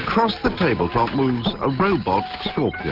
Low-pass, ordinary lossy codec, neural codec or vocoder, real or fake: 5.4 kHz; Opus, 24 kbps; none; real